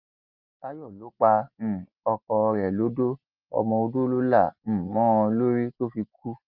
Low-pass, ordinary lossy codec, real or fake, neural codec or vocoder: 5.4 kHz; Opus, 32 kbps; real; none